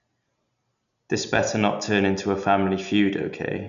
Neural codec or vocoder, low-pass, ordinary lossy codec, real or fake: none; 7.2 kHz; none; real